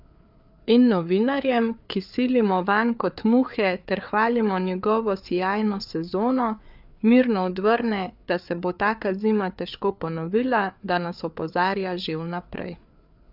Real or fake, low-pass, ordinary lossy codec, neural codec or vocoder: fake; 5.4 kHz; none; codec, 16 kHz, 4 kbps, FreqCodec, larger model